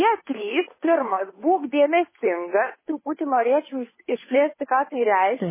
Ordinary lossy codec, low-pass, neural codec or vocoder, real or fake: MP3, 16 kbps; 3.6 kHz; codec, 16 kHz, 2 kbps, FunCodec, trained on Chinese and English, 25 frames a second; fake